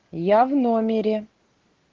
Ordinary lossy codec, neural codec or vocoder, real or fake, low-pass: Opus, 16 kbps; none; real; 7.2 kHz